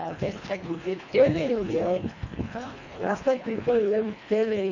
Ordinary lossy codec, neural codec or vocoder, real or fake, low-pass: none; codec, 24 kHz, 1.5 kbps, HILCodec; fake; 7.2 kHz